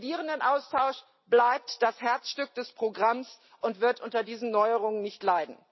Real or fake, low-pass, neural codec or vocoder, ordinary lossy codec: real; 7.2 kHz; none; MP3, 24 kbps